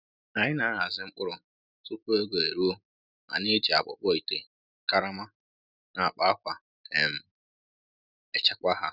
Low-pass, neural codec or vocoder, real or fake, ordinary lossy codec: 5.4 kHz; none; real; none